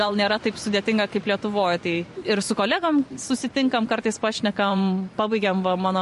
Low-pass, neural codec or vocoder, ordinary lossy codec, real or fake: 14.4 kHz; vocoder, 44.1 kHz, 128 mel bands every 512 samples, BigVGAN v2; MP3, 48 kbps; fake